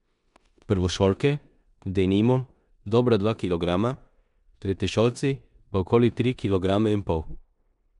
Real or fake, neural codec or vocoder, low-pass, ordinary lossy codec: fake; codec, 16 kHz in and 24 kHz out, 0.9 kbps, LongCat-Audio-Codec, four codebook decoder; 10.8 kHz; none